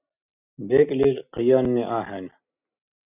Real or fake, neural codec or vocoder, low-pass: real; none; 3.6 kHz